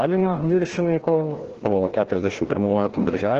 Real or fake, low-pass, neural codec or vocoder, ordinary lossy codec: fake; 7.2 kHz; codec, 16 kHz, 1 kbps, FreqCodec, larger model; Opus, 16 kbps